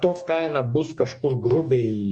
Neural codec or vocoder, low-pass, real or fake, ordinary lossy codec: codec, 44.1 kHz, 2.6 kbps, DAC; 9.9 kHz; fake; Opus, 64 kbps